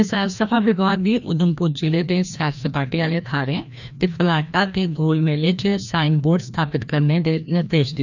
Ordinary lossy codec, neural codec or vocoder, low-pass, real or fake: none; codec, 16 kHz, 1 kbps, FreqCodec, larger model; 7.2 kHz; fake